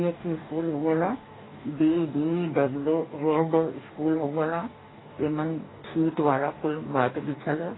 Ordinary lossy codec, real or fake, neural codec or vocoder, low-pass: AAC, 16 kbps; fake; codec, 44.1 kHz, 2.6 kbps, DAC; 7.2 kHz